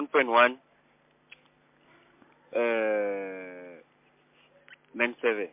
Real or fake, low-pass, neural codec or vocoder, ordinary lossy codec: real; 3.6 kHz; none; MP3, 32 kbps